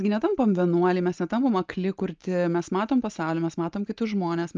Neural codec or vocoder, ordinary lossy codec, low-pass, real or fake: none; Opus, 24 kbps; 7.2 kHz; real